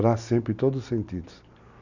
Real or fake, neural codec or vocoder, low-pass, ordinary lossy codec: real; none; 7.2 kHz; none